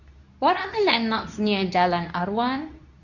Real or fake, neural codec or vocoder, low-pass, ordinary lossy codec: fake; codec, 24 kHz, 0.9 kbps, WavTokenizer, medium speech release version 2; 7.2 kHz; none